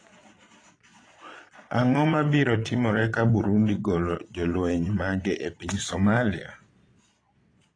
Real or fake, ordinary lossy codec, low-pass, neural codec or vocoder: fake; AAC, 32 kbps; 9.9 kHz; vocoder, 22.05 kHz, 80 mel bands, Vocos